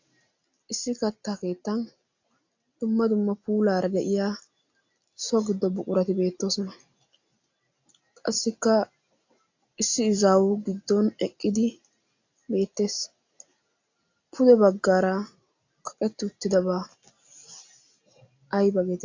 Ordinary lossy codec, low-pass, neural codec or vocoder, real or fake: AAC, 48 kbps; 7.2 kHz; none; real